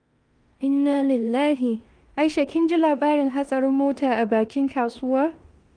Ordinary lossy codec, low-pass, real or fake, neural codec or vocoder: Opus, 32 kbps; 9.9 kHz; fake; codec, 16 kHz in and 24 kHz out, 0.9 kbps, LongCat-Audio-Codec, four codebook decoder